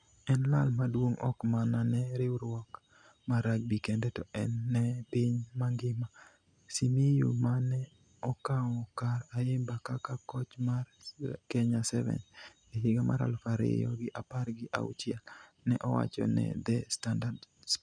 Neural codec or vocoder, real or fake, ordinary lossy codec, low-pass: none; real; none; 9.9 kHz